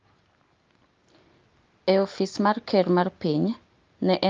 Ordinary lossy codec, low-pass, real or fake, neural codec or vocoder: Opus, 32 kbps; 7.2 kHz; real; none